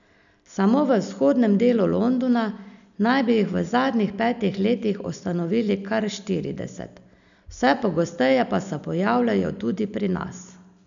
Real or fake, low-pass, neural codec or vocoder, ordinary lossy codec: real; 7.2 kHz; none; none